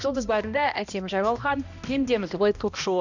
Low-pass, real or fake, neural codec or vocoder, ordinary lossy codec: 7.2 kHz; fake; codec, 16 kHz, 1 kbps, X-Codec, HuBERT features, trained on balanced general audio; none